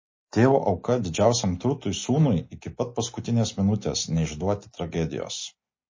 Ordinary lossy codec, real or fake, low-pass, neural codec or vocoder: MP3, 32 kbps; real; 7.2 kHz; none